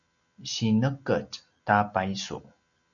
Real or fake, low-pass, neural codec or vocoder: real; 7.2 kHz; none